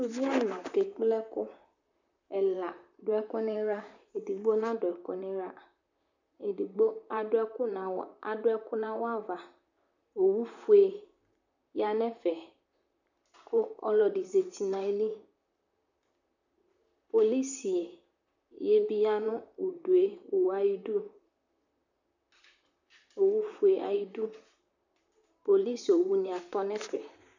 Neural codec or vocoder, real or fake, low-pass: vocoder, 44.1 kHz, 128 mel bands, Pupu-Vocoder; fake; 7.2 kHz